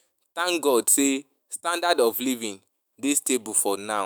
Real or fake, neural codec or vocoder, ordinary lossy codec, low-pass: fake; autoencoder, 48 kHz, 128 numbers a frame, DAC-VAE, trained on Japanese speech; none; none